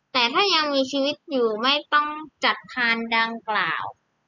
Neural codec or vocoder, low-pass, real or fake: none; 7.2 kHz; real